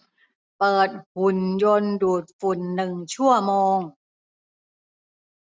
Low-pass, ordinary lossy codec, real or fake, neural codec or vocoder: none; none; real; none